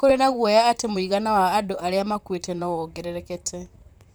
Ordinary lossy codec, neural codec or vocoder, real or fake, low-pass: none; vocoder, 44.1 kHz, 128 mel bands, Pupu-Vocoder; fake; none